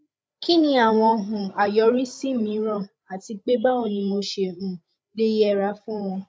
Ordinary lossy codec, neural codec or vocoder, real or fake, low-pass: none; codec, 16 kHz, 8 kbps, FreqCodec, larger model; fake; none